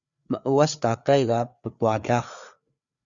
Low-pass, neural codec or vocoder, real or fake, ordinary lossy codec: 7.2 kHz; codec, 16 kHz, 4 kbps, FreqCodec, larger model; fake; Opus, 64 kbps